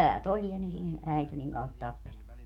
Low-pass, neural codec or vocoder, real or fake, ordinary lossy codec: 19.8 kHz; codec, 44.1 kHz, 7.8 kbps, DAC; fake; MP3, 96 kbps